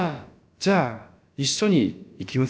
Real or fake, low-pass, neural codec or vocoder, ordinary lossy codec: fake; none; codec, 16 kHz, about 1 kbps, DyCAST, with the encoder's durations; none